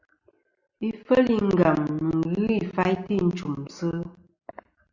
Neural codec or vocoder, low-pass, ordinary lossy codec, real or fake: none; 7.2 kHz; Opus, 64 kbps; real